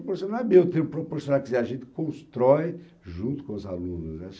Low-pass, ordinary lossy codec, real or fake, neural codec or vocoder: none; none; real; none